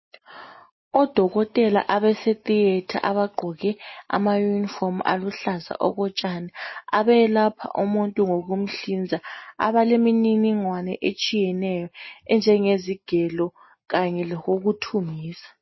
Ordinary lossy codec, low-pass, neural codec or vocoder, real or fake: MP3, 24 kbps; 7.2 kHz; none; real